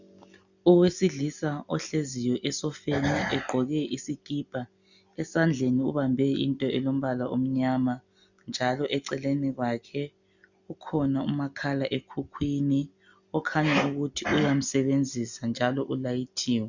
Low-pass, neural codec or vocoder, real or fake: 7.2 kHz; none; real